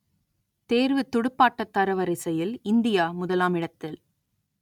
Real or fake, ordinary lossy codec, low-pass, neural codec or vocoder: real; none; 19.8 kHz; none